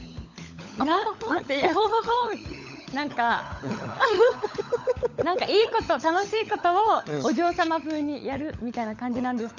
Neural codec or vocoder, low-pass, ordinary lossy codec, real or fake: codec, 16 kHz, 16 kbps, FunCodec, trained on LibriTTS, 50 frames a second; 7.2 kHz; none; fake